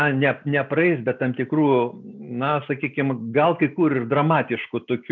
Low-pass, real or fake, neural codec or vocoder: 7.2 kHz; real; none